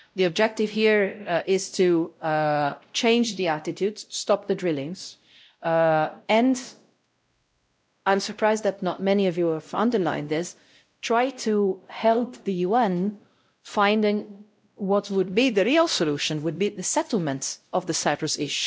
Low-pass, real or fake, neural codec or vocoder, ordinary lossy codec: none; fake; codec, 16 kHz, 0.5 kbps, X-Codec, WavLM features, trained on Multilingual LibriSpeech; none